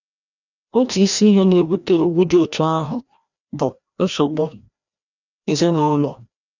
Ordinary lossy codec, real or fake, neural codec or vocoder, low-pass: none; fake; codec, 16 kHz, 1 kbps, FreqCodec, larger model; 7.2 kHz